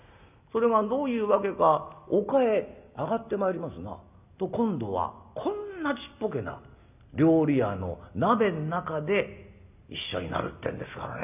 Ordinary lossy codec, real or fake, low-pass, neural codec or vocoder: none; real; 3.6 kHz; none